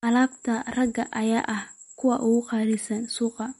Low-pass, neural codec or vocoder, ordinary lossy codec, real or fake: 19.8 kHz; none; MP3, 48 kbps; real